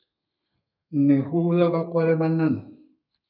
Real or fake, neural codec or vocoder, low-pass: fake; codec, 32 kHz, 1.9 kbps, SNAC; 5.4 kHz